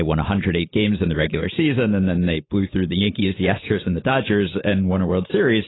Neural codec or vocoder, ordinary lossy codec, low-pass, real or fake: none; AAC, 16 kbps; 7.2 kHz; real